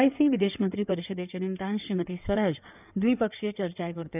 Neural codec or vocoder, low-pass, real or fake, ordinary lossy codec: codec, 16 kHz in and 24 kHz out, 2.2 kbps, FireRedTTS-2 codec; 3.6 kHz; fake; none